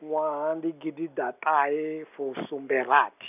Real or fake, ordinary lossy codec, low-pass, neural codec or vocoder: real; none; 3.6 kHz; none